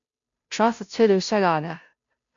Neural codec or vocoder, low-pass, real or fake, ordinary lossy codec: codec, 16 kHz, 0.5 kbps, FunCodec, trained on Chinese and English, 25 frames a second; 7.2 kHz; fake; MP3, 64 kbps